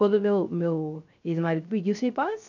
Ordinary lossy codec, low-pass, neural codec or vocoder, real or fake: none; 7.2 kHz; codec, 16 kHz, 0.3 kbps, FocalCodec; fake